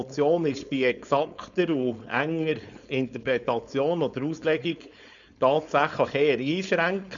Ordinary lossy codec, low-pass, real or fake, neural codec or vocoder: none; 7.2 kHz; fake; codec, 16 kHz, 4.8 kbps, FACodec